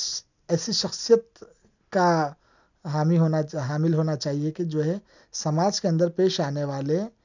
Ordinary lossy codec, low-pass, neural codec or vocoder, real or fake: none; 7.2 kHz; none; real